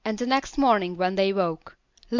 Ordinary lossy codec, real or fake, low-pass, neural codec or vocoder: MP3, 64 kbps; real; 7.2 kHz; none